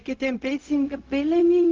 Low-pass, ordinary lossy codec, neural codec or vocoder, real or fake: 7.2 kHz; Opus, 24 kbps; codec, 16 kHz, 0.4 kbps, LongCat-Audio-Codec; fake